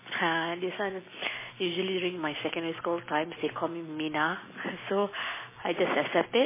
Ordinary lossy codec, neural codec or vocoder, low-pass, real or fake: MP3, 16 kbps; none; 3.6 kHz; real